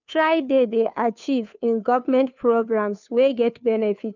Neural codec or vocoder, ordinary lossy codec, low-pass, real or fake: codec, 16 kHz, 2 kbps, FunCodec, trained on Chinese and English, 25 frames a second; none; 7.2 kHz; fake